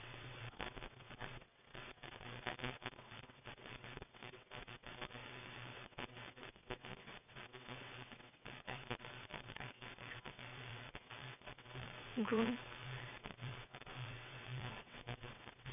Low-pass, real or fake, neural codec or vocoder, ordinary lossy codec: 3.6 kHz; fake; vocoder, 22.05 kHz, 80 mel bands, WaveNeXt; none